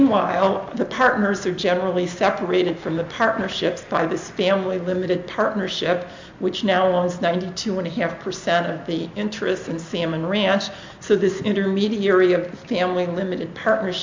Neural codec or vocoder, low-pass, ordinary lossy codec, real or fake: none; 7.2 kHz; MP3, 64 kbps; real